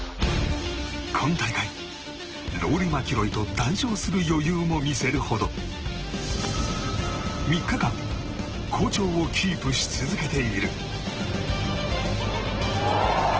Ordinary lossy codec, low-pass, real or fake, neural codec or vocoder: Opus, 16 kbps; 7.2 kHz; real; none